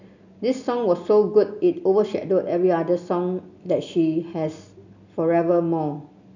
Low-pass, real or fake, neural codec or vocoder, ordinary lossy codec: 7.2 kHz; real; none; none